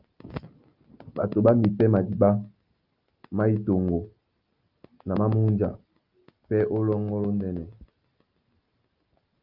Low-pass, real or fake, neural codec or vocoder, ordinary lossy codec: 5.4 kHz; real; none; Opus, 24 kbps